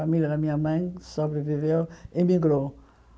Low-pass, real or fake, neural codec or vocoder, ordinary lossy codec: none; real; none; none